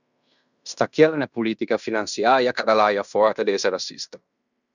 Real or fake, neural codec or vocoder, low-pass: fake; codec, 16 kHz in and 24 kHz out, 0.9 kbps, LongCat-Audio-Codec, fine tuned four codebook decoder; 7.2 kHz